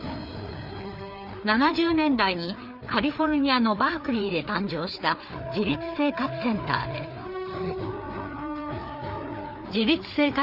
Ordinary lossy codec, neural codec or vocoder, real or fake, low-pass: none; codec, 16 kHz, 4 kbps, FreqCodec, larger model; fake; 5.4 kHz